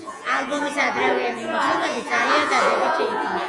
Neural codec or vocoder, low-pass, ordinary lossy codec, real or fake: vocoder, 48 kHz, 128 mel bands, Vocos; 10.8 kHz; Opus, 64 kbps; fake